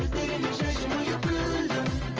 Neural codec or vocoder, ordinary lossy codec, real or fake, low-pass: vocoder, 44.1 kHz, 128 mel bands every 512 samples, BigVGAN v2; Opus, 24 kbps; fake; 7.2 kHz